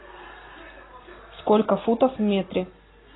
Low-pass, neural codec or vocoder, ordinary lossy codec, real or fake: 7.2 kHz; none; AAC, 16 kbps; real